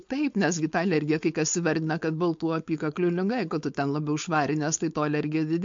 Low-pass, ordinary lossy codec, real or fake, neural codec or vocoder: 7.2 kHz; MP3, 48 kbps; fake; codec, 16 kHz, 4.8 kbps, FACodec